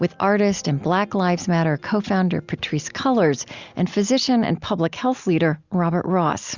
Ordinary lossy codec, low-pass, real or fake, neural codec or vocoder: Opus, 64 kbps; 7.2 kHz; real; none